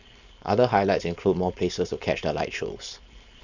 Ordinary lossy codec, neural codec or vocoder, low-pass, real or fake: none; codec, 16 kHz, 4.8 kbps, FACodec; 7.2 kHz; fake